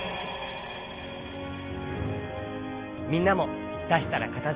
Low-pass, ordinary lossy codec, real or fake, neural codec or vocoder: 3.6 kHz; Opus, 32 kbps; real; none